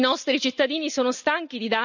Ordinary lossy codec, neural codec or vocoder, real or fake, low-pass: none; none; real; 7.2 kHz